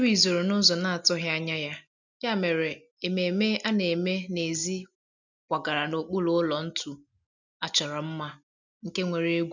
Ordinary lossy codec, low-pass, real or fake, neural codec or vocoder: none; 7.2 kHz; real; none